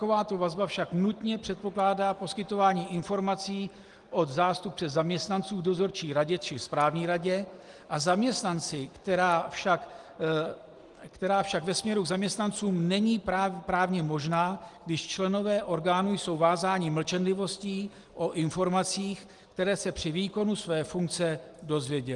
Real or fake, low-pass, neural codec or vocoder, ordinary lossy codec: real; 10.8 kHz; none; Opus, 24 kbps